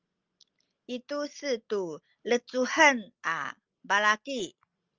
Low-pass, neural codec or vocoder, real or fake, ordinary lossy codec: 7.2 kHz; none; real; Opus, 24 kbps